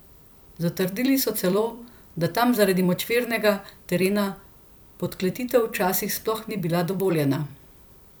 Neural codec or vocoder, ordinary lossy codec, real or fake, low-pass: vocoder, 44.1 kHz, 128 mel bands every 256 samples, BigVGAN v2; none; fake; none